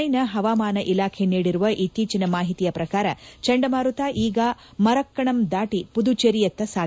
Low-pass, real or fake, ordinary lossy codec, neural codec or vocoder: none; real; none; none